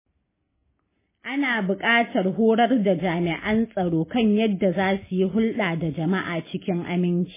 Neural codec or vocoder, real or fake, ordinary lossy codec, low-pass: none; real; MP3, 16 kbps; 3.6 kHz